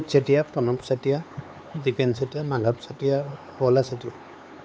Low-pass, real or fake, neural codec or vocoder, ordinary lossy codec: none; fake; codec, 16 kHz, 4 kbps, X-Codec, HuBERT features, trained on LibriSpeech; none